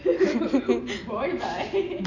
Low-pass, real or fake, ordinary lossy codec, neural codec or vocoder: 7.2 kHz; real; none; none